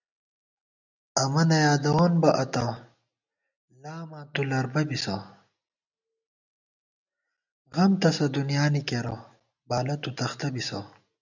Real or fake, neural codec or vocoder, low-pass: real; none; 7.2 kHz